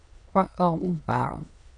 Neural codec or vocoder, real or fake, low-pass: autoencoder, 22.05 kHz, a latent of 192 numbers a frame, VITS, trained on many speakers; fake; 9.9 kHz